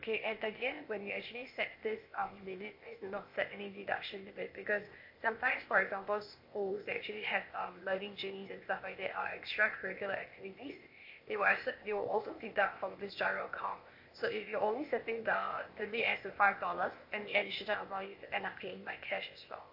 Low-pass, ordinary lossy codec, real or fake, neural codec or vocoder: 5.4 kHz; MP3, 32 kbps; fake; codec, 16 kHz, 0.8 kbps, ZipCodec